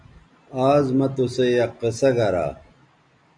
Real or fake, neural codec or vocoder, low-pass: real; none; 9.9 kHz